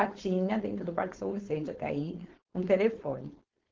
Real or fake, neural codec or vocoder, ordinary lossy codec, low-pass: fake; codec, 16 kHz, 4.8 kbps, FACodec; Opus, 16 kbps; 7.2 kHz